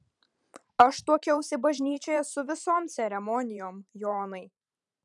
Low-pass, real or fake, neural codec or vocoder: 10.8 kHz; fake; vocoder, 44.1 kHz, 128 mel bands every 512 samples, BigVGAN v2